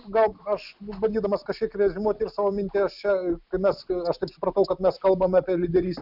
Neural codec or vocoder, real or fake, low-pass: none; real; 5.4 kHz